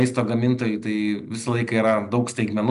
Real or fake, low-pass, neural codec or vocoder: real; 10.8 kHz; none